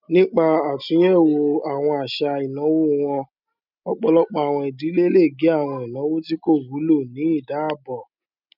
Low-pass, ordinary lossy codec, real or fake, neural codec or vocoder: 5.4 kHz; none; real; none